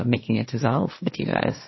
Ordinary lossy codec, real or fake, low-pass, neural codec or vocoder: MP3, 24 kbps; fake; 7.2 kHz; codec, 16 kHz, 1.1 kbps, Voila-Tokenizer